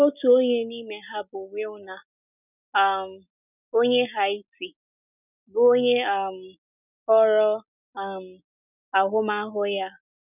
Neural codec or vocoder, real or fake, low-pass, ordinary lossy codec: none; real; 3.6 kHz; none